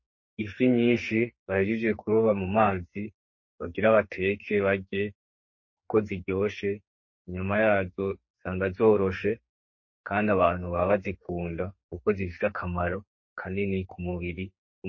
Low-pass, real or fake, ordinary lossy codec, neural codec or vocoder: 7.2 kHz; fake; MP3, 32 kbps; codec, 44.1 kHz, 2.6 kbps, SNAC